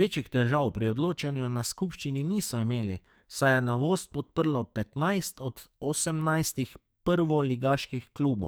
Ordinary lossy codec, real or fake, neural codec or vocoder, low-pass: none; fake; codec, 44.1 kHz, 2.6 kbps, SNAC; none